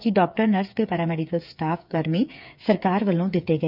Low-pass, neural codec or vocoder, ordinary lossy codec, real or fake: 5.4 kHz; codec, 16 kHz, 8 kbps, FreqCodec, smaller model; none; fake